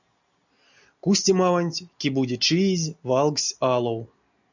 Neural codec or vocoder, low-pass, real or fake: none; 7.2 kHz; real